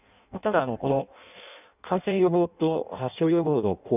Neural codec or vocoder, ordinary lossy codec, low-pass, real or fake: codec, 16 kHz in and 24 kHz out, 0.6 kbps, FireRedTTS-2 codec; none; 3.6 kHz; fake